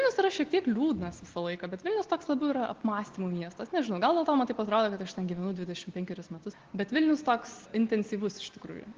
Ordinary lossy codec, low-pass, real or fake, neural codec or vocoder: Opus, 16 kbps; 7.2 kHz; real; none